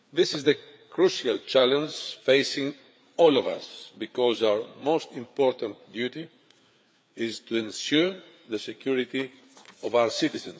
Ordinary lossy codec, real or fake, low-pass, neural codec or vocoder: none; fake; none; codec, 16 kHz, 4 kbps, FreqCodec, larger model